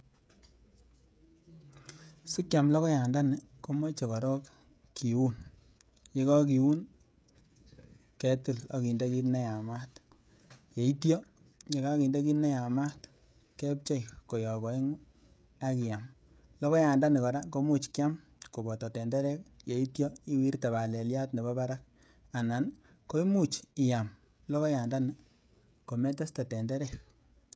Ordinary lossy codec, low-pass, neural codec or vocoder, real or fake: none; none; codec, 16 kHz, 16 kbps, FreqCodec, smaller model; fake